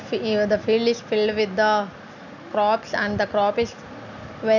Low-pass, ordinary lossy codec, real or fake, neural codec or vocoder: 7.2 kHz; none; real; none